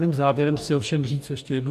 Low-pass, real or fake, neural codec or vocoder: 14.4 kHz; fake; codec, 44.1 kHz, 2.6 kbps, DAC